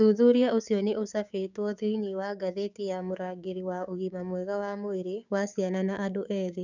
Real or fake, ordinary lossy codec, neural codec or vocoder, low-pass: fake; none; codec, 16 kHz, 6 kbps, DAC; 7.2 kHz